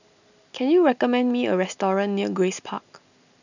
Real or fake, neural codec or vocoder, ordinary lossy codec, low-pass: real; none; none; 7.2 kHz